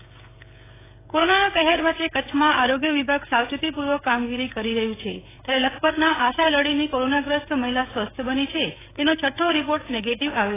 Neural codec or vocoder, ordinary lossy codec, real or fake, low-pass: codec, 16 kHz, 16 kbps, FreqCodec, smaller model; AAC, 16 kbps; fake; 3.6 kHz